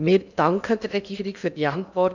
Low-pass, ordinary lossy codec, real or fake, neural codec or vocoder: 7.2 kHz; none; fake; codec, 16 kHz in and 24 kHz out, 0.8 kbps, FocalCodec, streaming, 65536 codes